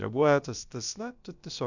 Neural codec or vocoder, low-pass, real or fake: codec, 16 kHz, about 1 kbps, DyCAST, with the encoder's durations; 7.2 kHz; fake